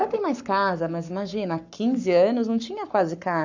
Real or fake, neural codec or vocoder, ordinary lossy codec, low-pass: fake; codec, 44.1 kHz, 7.8 kbps, Pupu-Codec; none; 7.2 kHz